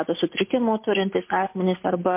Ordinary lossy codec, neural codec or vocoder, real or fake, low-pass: MP3, 24 kbps; none; real; 3.6 kHz